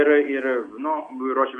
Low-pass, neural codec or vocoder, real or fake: 9.9 kHz; none; real